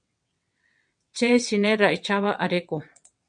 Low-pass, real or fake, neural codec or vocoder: 9.9 kHz; fake; vocoder, 22.05 kHz, 80 mel bands, WaveNeXt